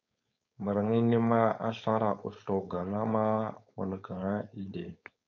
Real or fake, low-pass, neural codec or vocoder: fake; 7.2 kHz; codec, 16 kHz, 4.8 kbps, FACodec